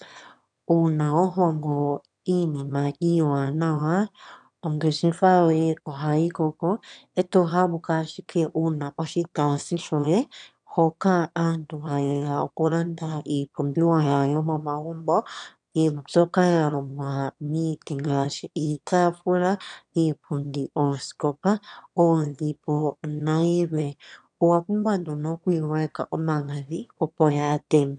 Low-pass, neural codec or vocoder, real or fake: 9.9 kHz; autoencoder, 22.05 kHz, a latent of 192 numbers a frame, VITS, trained on one speaker; fake